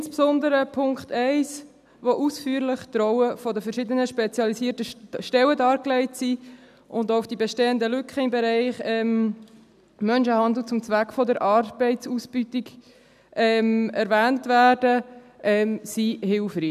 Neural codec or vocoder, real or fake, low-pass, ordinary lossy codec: none; real; 14.4 kHz; none